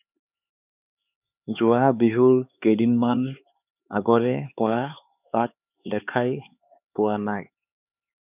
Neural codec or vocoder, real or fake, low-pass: codec, 16 kHz, 4 kbps, X-Codec, HuBERT features, trained on LibriSpeech; fake; 3.6 kHz